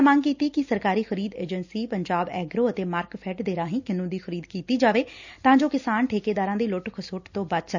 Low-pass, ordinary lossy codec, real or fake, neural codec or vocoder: 7.2 kHz; none; real; none